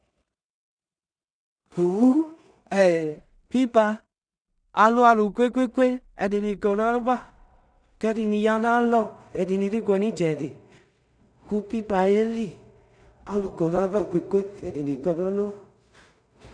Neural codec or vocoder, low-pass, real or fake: codec, 16 kHz in and 24 kHz out, 0.4 kbps, LongCat-Audio-Codec, two codebook decoder; 9.9 kHz; fake